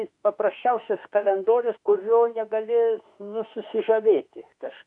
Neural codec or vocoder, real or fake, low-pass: autoencoder, 48 kHz, 32 numbers a frame, DAC-VAE, trained on Japanese speech; fake; 10.8 kHz